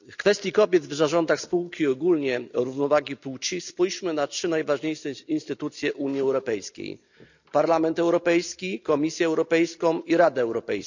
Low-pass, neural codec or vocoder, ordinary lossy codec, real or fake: 7.2 kHz; none; none; real